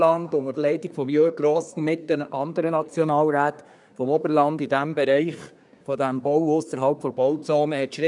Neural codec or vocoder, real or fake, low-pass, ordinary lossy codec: codec, 24 kHz, 1 kbps, SNAC; fake; 10.8 kHz; none